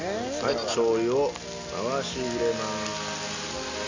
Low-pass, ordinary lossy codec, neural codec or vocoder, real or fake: 7.2 kHz; AAC, 48 kbps; none; real